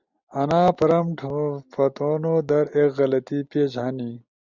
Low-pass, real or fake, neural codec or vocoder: 7.2 kHz; real; none